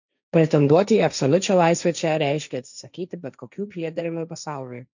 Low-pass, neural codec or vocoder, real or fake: 7.2 kHz; codec, 16 kHz, 1.1 kbps, Voila-Tokenizer; fake